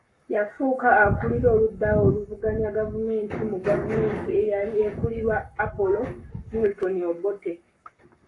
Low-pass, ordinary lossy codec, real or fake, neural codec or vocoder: 10.8 kHz; AAC, 48 kbps; fake; codec, 44.1 kHz, 7.8 kbps, Pupu-Codec